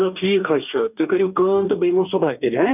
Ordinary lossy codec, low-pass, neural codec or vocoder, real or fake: none; 3.6 kHz; codec, 44.1 kHz, 2.6 kbps, DAC; fake